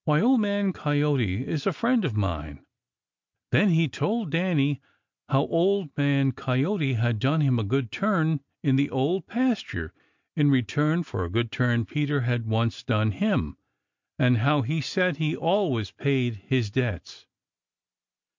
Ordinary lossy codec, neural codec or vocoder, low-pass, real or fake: MP3, 64 kbps; none; 7.2 kHz; real